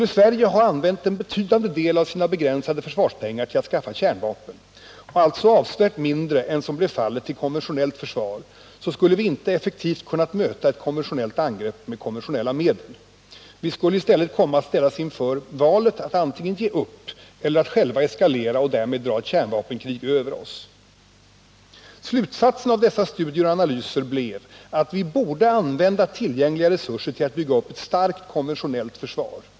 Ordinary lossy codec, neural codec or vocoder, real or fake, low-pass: none; none; real; none